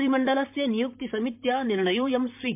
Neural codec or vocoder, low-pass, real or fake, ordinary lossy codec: vocoder, 44.1 kHz, 128 mel bands, Pupu-Vocoder; 3.6 kHz; fake; MP3, 32 kbps